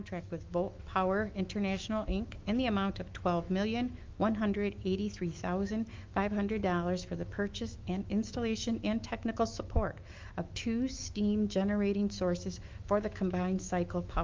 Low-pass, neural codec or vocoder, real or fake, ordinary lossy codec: 7.2 kHz; autoencoder, 48 kHz, 128 numbers a frame, DAC-VAE, trained on Japanese speech; fake; Opus, 32 kbps